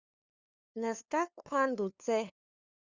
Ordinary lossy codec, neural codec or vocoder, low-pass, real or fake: Opus, 64 kbps; codec, 16 kHz, 2 kbps, FunCodec, trained on LibriTTS, 25 frames a second; 7.2 kHz; fake